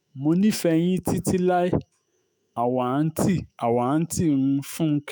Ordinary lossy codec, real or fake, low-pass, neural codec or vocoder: none; fake; none; autoencoder, 48 kHz, 128 numbers a frame, DAC-VAE, trained on Japanese speech